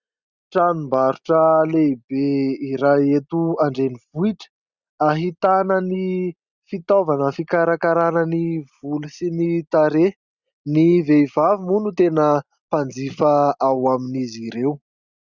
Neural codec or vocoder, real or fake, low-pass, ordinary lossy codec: none; real; 7.2 kHz; Opus, 64 kbps